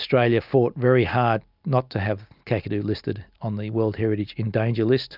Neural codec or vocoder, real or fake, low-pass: none; real; 5.4 kHz